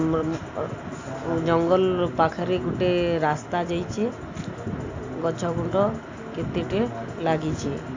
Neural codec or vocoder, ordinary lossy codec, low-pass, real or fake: none; AAC, 48 kbps; 7.2 kHz; real